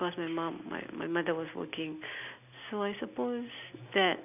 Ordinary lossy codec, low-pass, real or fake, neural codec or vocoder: none; 3.6 kHz; real; none